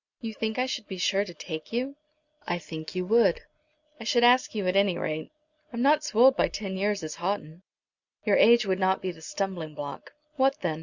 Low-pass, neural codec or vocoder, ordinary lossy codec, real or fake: 7.2 kHz; none; Opus, 64 kbps; real